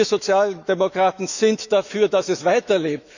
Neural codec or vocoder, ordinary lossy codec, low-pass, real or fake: autoencoder, 48 kHz, 128 numbers a frame, DAC-VAE, trained on Japanese speech; none; 7.2 kHz; fake